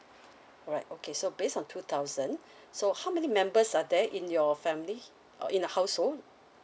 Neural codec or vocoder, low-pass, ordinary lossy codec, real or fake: none; none; none; real